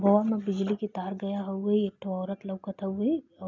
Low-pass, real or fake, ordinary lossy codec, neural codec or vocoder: 7.2 kHz; real; none; none